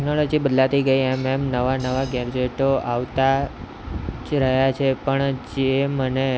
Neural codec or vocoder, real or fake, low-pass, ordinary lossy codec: none; real; none; none